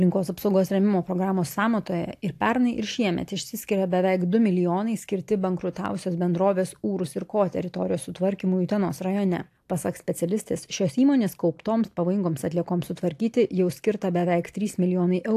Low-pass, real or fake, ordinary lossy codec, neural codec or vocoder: 14.4 kHz; real; AAC, 64 kbps; none